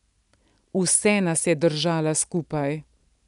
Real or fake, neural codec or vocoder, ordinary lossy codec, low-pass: real; none; none; 10.8 kHz